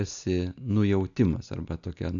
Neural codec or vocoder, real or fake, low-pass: none; real; 7.2 kHz